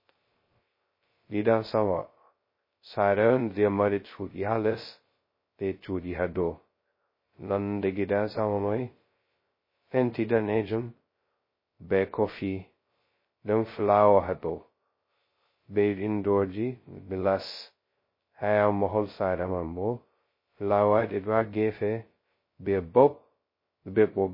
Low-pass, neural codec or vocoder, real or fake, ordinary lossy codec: 5.4 kHz; codec, 16 kHz, 0.2 kbps, FocalCodec; fake; MP3, 24 kbps